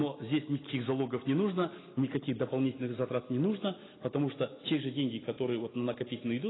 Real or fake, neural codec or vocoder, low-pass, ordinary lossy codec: real; none; 7.2 kHz; AAC, 16 kbps